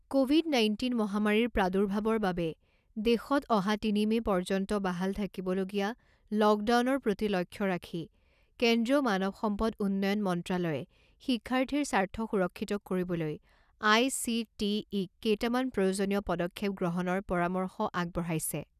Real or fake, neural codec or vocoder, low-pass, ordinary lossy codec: real; none; 14.4 kHz; none